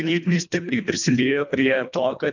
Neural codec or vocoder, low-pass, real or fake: codec, 24 kHz, 1.5 kbps, HILCodec; 7.2 kHz; fake